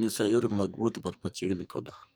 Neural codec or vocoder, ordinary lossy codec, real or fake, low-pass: codec, 44.1 kHz, 2.6 kbps, SNAC; none; fake; none